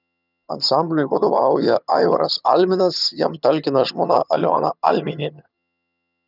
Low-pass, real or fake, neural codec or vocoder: 5.4 kHz; fake; vocoder, 22.05 kHz, 80 mel bands, HiFi-GAN